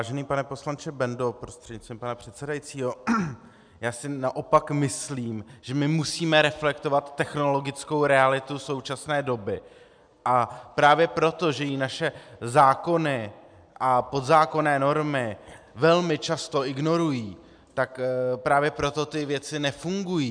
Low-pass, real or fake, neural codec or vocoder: 9.9 kHz; real; none